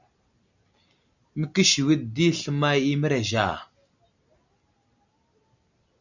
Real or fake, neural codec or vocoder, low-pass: real; none; 7.2 kHz